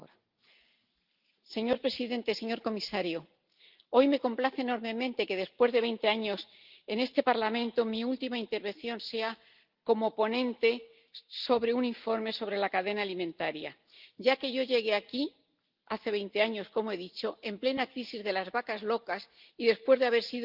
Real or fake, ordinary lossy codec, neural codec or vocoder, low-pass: real; Opus, 32 kbps; none; 5.4 kHz